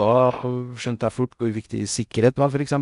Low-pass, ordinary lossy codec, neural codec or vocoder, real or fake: 10.8 kHz; none; codec, 16 kHz in and 24 kHz out, 0.6 kbps, FocalCodec, streaming, 4096 codes; fake